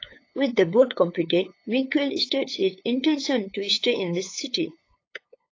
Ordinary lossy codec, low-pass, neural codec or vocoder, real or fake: AAC, 32 kbps; 7.2 kHz; codec, 16 kHz, 8 kbps, FunCodec, trained on LibriTTS, 25 frames a second; fake